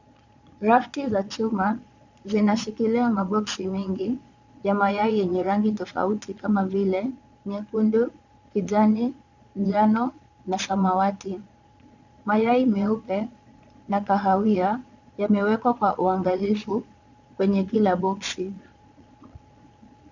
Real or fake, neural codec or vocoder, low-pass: fake; vocoder, 22.05 kHz, 80 mel bands, Vocos; 7.2 kHz